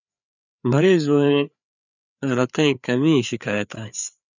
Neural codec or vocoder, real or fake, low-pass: codec, 16 kHz, 4 kbps, FreqCodec, larger model; fake; 7.2 kHz